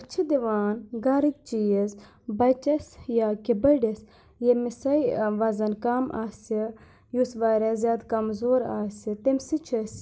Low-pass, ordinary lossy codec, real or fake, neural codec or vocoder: none; none; real; none